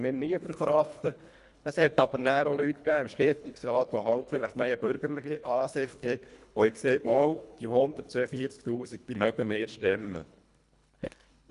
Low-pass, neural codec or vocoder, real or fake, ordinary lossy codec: 10.8 kHz; codec, 24 kHz, 1.5 kbps, HILCodec; fake; none